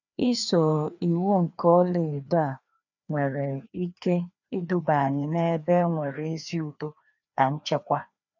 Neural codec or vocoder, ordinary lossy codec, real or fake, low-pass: codec, 16 kHz, 2 kbps, FreqCodec, larger model; none; fake; 7.2 kHz